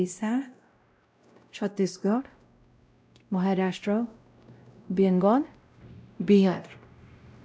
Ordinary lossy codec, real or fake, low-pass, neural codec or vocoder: none; fake; none; codec, 16 kHz, 0.5 kbps, X-Codec, WavLM features, trained on Multilingual LibriSpeech